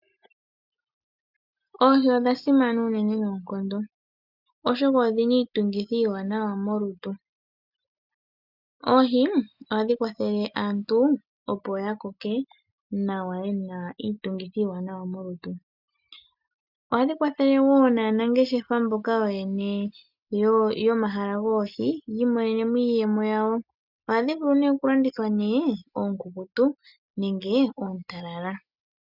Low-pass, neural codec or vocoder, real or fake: 5.4 kHz; none; real